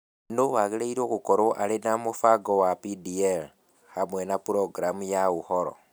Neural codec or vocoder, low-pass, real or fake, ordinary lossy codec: none; none; real; none